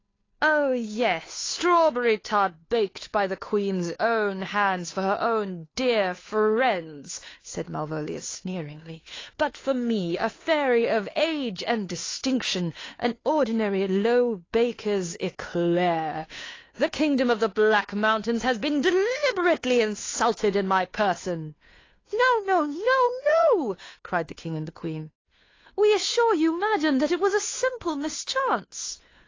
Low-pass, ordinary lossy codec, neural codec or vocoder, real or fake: 7.2 kHz; AAC, 32 kbps; codec, 16 kHz, 2 kbps, FunCodec, trained on Chinese and English, 25 frames a second; fake